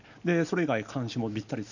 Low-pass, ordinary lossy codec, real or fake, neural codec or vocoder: 7.2 kHz; none; real; none